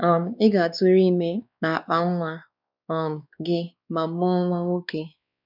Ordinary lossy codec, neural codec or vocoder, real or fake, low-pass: none; codec, 16 kHz, 2 kbps, X-Codec, WavLM features, trained on Multilingual LibriSpeech; fake; 5.4 kHz